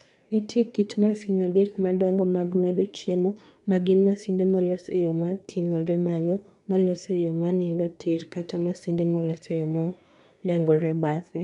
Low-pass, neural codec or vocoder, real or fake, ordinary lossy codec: 10.8 kHz; codec, 24 kHz, 1 kbps, SNAC; fake; none